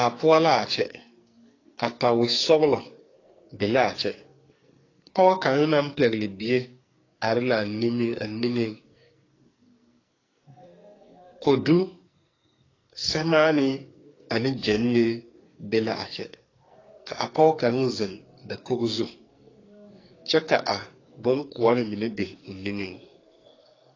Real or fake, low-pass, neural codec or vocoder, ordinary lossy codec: fake; 7.2 kHz; codec, 44.1 kHz, 2.6 kbps, SNAC; AAC, 32 kbps